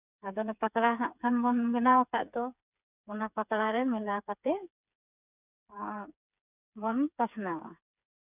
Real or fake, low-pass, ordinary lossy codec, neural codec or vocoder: fake; 3.6 kHz; none; codec, 16 kHz, 4 kbps, FreqCodec, smaller model